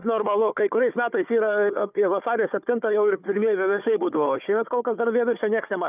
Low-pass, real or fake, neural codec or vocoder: 3.6 kHz; fake; codec, 16 kHz, 4 kbps, FunCodec, trained on Chinese and English, 50 frames a second